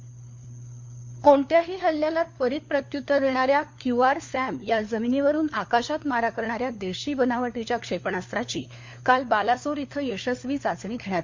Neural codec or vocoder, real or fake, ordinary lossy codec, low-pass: codec, 16 kHz, 4 kbps, FunCodec, trained on LibriTTS, 50 frames a second; fake; MP3, 48 kbps; 7.2 kHz